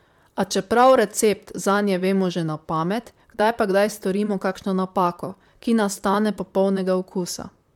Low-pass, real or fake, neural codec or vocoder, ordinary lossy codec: 19.8 kHz; fake; vocoder, 44.1 kHz, 128 mel bands, Pupu-Vocoder; MP3, 96 kbps